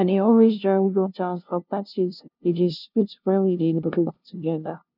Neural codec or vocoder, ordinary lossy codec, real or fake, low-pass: codec, 16 kHz, 0.5 kbps, FunCodec, trained on LibriTTS, 25 frames a second; none; fake; 5.4 kHz